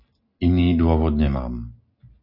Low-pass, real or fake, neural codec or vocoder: 5.4 kHz; real; none